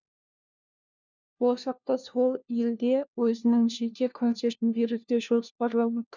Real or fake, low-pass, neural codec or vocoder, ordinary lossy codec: fake; 7.2 kHz; codec, 16 kHz, 1 kbps, FunCodec, trained on LibriTTS, 50 frames a second; none